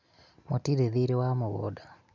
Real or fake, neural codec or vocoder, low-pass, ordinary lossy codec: real; none; 7.2 kHz; none